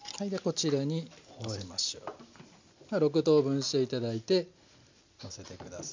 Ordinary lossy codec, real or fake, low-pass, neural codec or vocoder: MP3, 64 kbps; real; 7.2 kHz; none